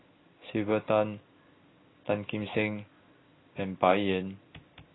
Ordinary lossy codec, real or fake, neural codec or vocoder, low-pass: AAC, 16 kbps; real; none; 7.2 kHz